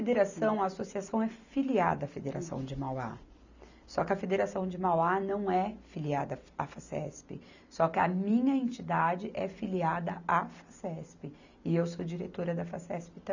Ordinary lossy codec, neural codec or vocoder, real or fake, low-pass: none; none; real; 7.2 kHz